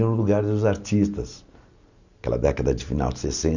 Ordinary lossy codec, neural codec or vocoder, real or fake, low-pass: none; none; real; 7.2 kHz